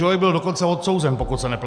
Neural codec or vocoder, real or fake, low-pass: none; real; 9.9 kHz